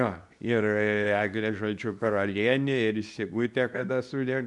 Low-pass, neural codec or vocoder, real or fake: 10.8 kHz; codec, 24 kHz, 0.9 kbps, WavTokenizer, medium speech release version 2; fake